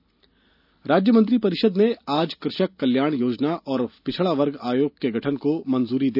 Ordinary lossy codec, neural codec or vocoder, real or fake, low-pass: none; none; real; 5.4 kHz